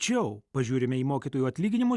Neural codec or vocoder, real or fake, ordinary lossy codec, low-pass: none; real; Opus, 64 kbps; 10.8 kHz